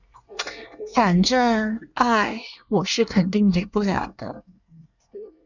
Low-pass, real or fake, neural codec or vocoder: 7.2 kHz; fake; codec, 24 kHz, 1 kbps, SNAC